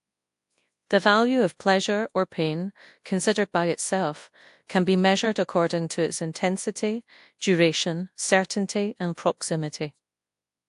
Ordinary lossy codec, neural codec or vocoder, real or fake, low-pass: AAC, 64 kbps; codec, 24 kHz, 0.9 kbps, WavTokenizer, large speech release; fake; 10.8 kHz